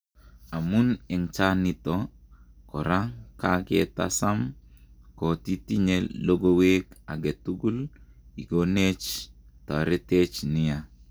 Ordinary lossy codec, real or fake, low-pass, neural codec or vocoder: none; real; none; none